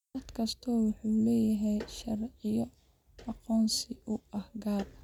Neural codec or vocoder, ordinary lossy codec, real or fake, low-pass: none; none; real; 19.8 kHz